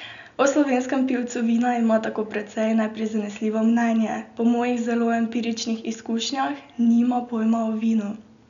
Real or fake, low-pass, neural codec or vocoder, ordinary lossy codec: real; 7.2 kHz; none; none